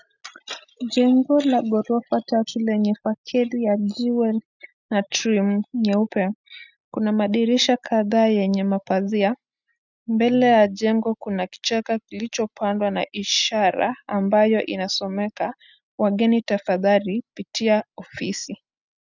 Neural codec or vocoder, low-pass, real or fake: none; 7.2 kHz; real